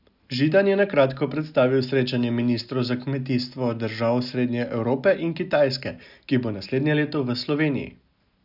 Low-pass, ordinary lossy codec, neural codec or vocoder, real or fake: 5.4 kHz; none; none; real